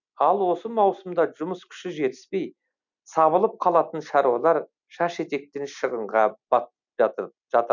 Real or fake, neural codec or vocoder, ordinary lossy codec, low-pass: real; none; none; 7.2 kHz